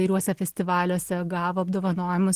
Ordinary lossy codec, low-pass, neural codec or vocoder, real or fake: Opus, 16 kbps; 14.4 kHz; none; real